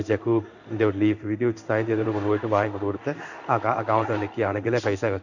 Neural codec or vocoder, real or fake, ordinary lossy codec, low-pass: codec, 16 kHz in and 24 kHz out, 1 kbps, XY-Tokenizer; fake; none; 7.2 kHz